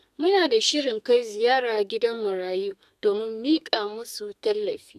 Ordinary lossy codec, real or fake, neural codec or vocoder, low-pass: none; fake; codec, 32 kHz, 1.9 kbps, SNAC; 14.4 kHz